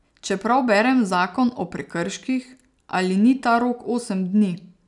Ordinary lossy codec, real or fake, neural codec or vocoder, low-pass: none; real; none; 10.8 kHz